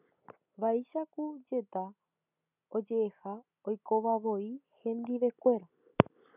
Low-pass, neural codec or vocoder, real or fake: 3.6 kHz; none; real